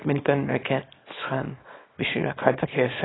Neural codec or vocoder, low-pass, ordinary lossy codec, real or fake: codec, 24 kHz, 0.9 kbps, WavTokenizer, small release; 7.2 kHz; AAC, 16 kbps; fake